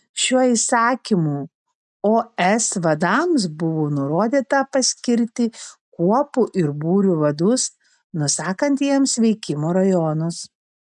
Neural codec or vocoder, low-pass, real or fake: none; 10.8 kHz; real